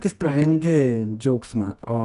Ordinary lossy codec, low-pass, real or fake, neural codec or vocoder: AAC, 96 kbps; 10.8 kHz; fake; codec, 24 kHz, 0.9 kbps, WavTokenizer, medium music audio release